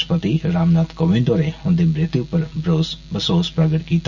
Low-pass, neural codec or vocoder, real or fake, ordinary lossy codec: 7.2 kHz; none; real; none